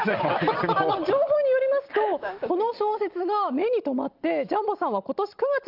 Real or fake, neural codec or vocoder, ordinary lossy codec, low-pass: real; none; Opus, 24 kbps; 5.4 kHz